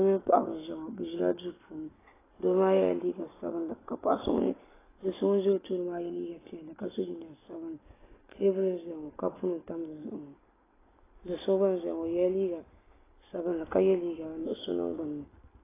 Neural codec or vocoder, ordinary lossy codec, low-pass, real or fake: none; AAC, 16 kbps; 3.6 kHz; real